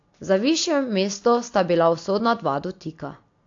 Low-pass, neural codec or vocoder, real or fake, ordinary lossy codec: 7.2 kHz; none; real; AAC, 48 kbps